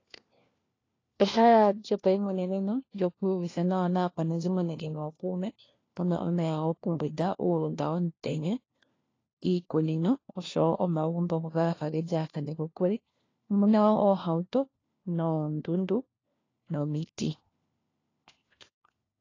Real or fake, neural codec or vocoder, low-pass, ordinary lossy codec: fake; codec, 16 kHz, 1 kbps, FunCodec, trained on LibriTTS, 50 frames a second; 7.2 kHz; AAC, 32 kbps